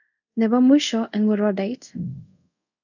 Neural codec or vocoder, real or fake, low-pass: codec, 24 kHz, 0.5 kbps, DualCodec; fake; 7.2 kHz